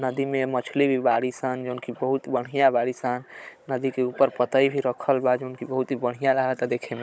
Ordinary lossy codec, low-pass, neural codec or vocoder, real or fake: none; none; codec, 16 kHz, 16 kbps, FunCodec, trained on Chinese and English, 50 frames a second; fake